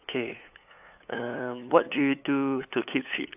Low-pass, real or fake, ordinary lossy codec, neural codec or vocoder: 3.6 kHz; fake; AAC, 32 kbps; codec, 16 kHz, 8 kbps, FunCodec, trained on LibriTTS, 25 frames a second